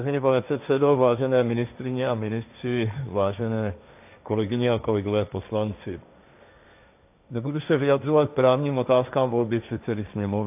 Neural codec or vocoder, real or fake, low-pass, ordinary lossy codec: codec, 16 kHz, 1.1 kbps, Voila-Tokenizer; fake; 3.6 kHz; AAC, 32 kbps